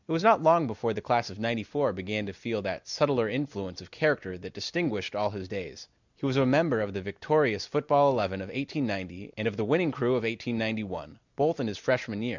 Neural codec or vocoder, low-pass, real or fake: none; 7.2 kHz; real